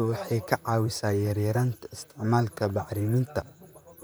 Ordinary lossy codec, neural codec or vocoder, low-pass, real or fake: none; vocoder, 44.1 kHz, 128 mel bands, Pupu-Vocoder; none; fake